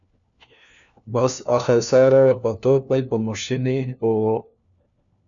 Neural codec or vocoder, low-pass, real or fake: codec, 16 kHz, 1 kbps, FunCodec, trained on LibriTTS, 50 frames a second; 7.2 kHz; fake